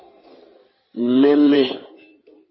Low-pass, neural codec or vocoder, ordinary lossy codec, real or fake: 7.2 kHz; codec, 16 kHz in and 24 kHz out, 2.2 kbps, FireRedTTS-2 codec; MP3, 24 kbps; fake